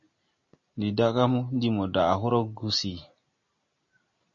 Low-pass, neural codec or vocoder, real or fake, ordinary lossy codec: 7.2 kHz; none; real; MP3, 32 kbps